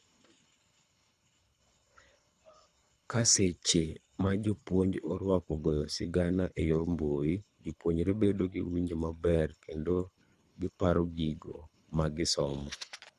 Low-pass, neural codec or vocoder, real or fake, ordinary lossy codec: none; codec, 24 kHz, 3 kbps, HILCodec; fake; none